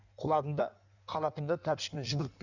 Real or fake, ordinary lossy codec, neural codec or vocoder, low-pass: fake; none; codec, 16 kHz in and 24 kHz out, 1.1 kbps, FireRedTTS-2 codec; 7.2 kHz